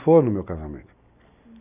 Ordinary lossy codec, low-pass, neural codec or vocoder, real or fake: none; 3.6 kHz; none; real